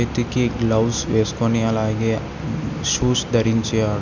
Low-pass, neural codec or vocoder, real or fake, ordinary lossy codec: 7.2 kHz; none; real; none